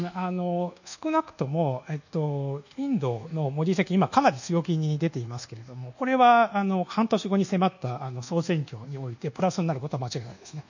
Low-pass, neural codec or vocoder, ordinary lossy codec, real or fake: 7.2 kHz; codec, 24 kHz, 1.2 kbps, DualCodec; none; fake